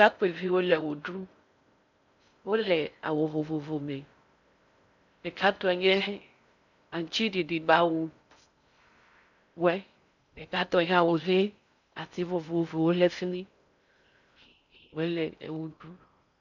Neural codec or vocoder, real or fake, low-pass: codec, 16 kHz in and 24 kHz out, 0.6 kbps, FocalCodec, streaming, 4096 codes; fake; 7.2 kHz